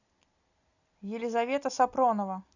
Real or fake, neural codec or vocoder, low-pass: real; none; 7.2 kHz